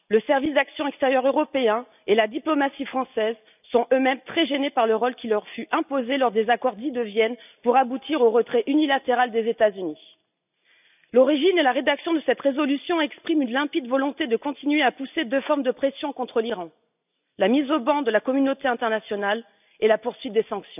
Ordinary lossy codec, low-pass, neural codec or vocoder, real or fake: none; 3.6 kHz; none; real